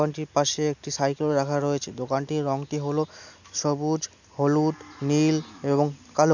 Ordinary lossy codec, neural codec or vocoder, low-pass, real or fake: none; none; 7.2 kHz; real